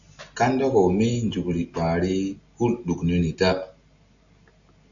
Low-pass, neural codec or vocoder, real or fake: 7.2 kHz; none; real